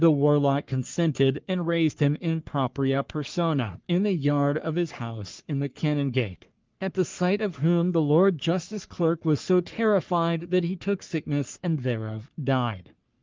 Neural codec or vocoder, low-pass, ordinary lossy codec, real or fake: codec, 44.1 kHz, 3.4 kbps, Pupu-Codec; 7.2 kHz; Opus, 32 kbps; fake